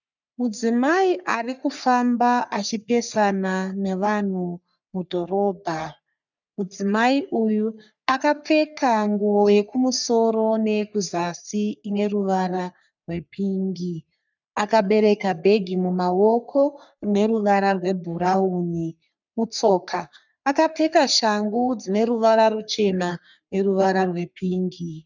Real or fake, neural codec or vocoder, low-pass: fake; codec, 44.1 kHz, 3.4 kbps, Pupu-Codec; 7.2 kHz